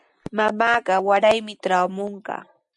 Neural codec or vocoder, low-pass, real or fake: none; 10.8 kHz; real